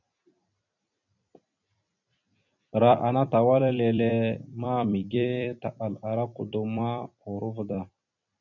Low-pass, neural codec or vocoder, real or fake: 7.2 kHz; vocoder, 44.1 kHz, 128 mel bands every 256 samples, BigVGAN v2; fake